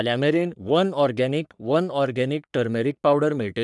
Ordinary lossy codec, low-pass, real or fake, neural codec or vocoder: none; 10.8 kHz; fake; codec, 44.1 kHz, 3.4 kbps, Pupu-Codec